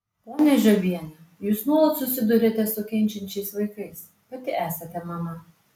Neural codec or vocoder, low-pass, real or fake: none; 19.8 kHz; real